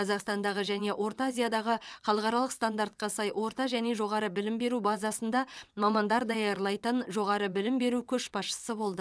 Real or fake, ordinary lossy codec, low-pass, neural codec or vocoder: fake; none; none; vocoder, 22.05 kHz, 80 mel bands, WaveNeXt